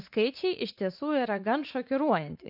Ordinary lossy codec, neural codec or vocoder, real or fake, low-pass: AAC, 48 kbps; vocoder, 44.1 kHz, 80 mel bands, Vocos; fake; 5.4 kHz